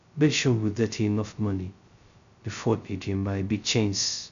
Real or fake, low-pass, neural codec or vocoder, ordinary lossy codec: fake; 7.2 kHz; codec, 16 kHz, 0.2 kbps, FocalCodec; none